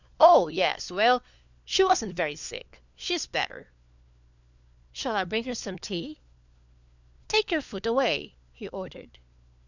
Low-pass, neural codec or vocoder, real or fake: 7.2 kHz; codec, 16 kHz, 4 kbps, FunCodec, trained on LibriTTS, 50 frames a second; fake